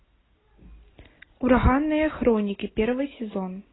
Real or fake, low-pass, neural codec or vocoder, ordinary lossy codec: real; 7.2 kHz; none; AAC, 16 kbps